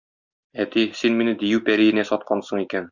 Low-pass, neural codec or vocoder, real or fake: 7.2 kHz; none; real